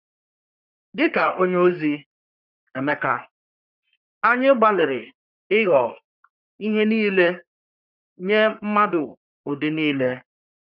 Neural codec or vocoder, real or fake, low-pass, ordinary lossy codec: codec, 44.1 kHz, 3.4 kbps, Pupu-Codec; fake; 5.4 kHz; none